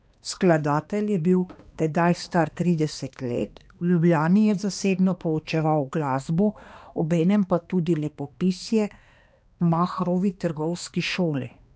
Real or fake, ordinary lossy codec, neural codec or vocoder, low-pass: fake; none; codec, 16 kHz, 2 kbps, X-Codec, HuBERT features, trained on balanced general audio; none